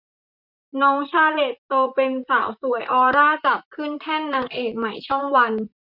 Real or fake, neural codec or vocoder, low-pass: fake; vocoder, 44.1 kHz, 128 mel bands, Pupu-Vocoder; 5.4 kHz